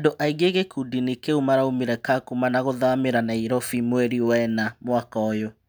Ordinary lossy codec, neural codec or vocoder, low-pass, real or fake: none; none; none; real